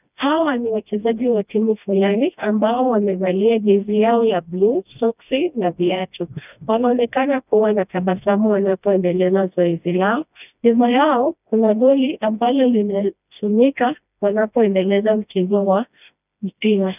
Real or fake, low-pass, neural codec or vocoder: fake; 3.6 kHz; codec, 16 kHz, 1 kbps, FreqCodec, smaller model